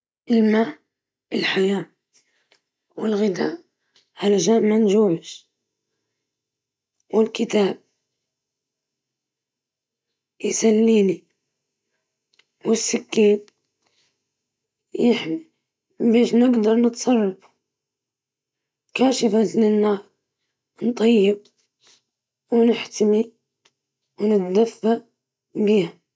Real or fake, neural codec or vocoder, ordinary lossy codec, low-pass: real; none; none; none